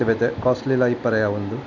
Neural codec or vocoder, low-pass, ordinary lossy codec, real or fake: none; 7.2 kHz; none; real